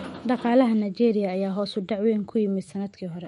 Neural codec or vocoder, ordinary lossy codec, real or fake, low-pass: autoencoder, 48 kHz, 128 numbers a frame, DAC-VAE, trained on Japanese speech; MP3, 48 kbps; fake; 19.8 kHz